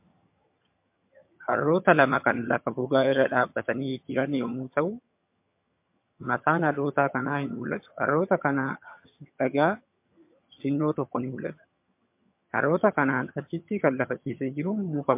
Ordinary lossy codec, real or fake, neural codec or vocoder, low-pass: MP3, 32 kbps; fake; vocoder, 22.05 kHz, 80 mel bands, HiFi-GAN; 3.6 kHz